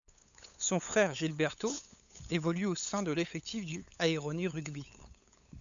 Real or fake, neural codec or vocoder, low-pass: fake; codec, 16 kHz, 8 kbps, FunCodec, trained on LibriTTS, 25 frames a second; 7.2 kHz